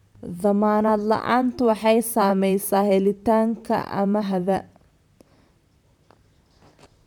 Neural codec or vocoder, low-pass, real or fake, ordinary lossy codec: vocoder, 44.1 kHz, 128 mel bands, Pupu-Vocoder; 19.8 kHz; fake; none